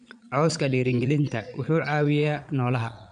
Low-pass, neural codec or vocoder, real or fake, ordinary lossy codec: 9.9 kHz; vocoder, 22.05 kHz, 80 mel bands, Vocos; fake; none